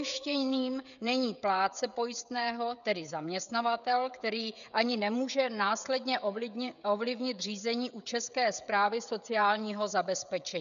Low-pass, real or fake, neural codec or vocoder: 7.2 kHz; fake; codec, 16 kHz, 16 kbps, FreqCodec, smaller model